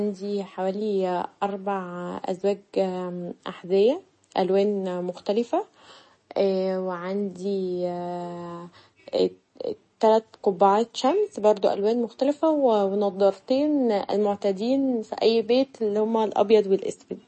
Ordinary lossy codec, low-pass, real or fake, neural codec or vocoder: MP3, 32 kbps; 9.9 kHz; real; none